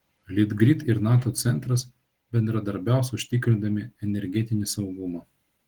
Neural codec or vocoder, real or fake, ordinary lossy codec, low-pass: none; real; Opus, 16 kbps; 19.8 kHz